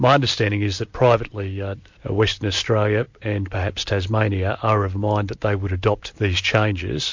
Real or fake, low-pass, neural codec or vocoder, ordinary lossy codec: real; 7.2 kHz; none; MP3, 48 kbps